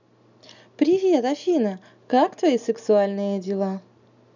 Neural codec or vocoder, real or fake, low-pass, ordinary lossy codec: none; real; 7.2 kHz; none